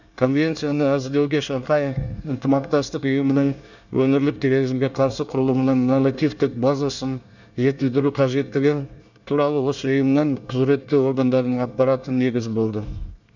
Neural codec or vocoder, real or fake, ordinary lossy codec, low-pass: codec, 24 kHz, 1 kbps, SNAC; fake; none; 7.2 kHz